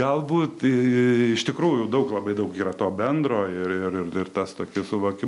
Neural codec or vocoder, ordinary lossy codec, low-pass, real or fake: none; MP3, 64 kbps; 10.8 kHz; real